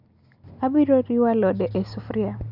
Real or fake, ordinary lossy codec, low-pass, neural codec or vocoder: real; none; 5.4 kHz; none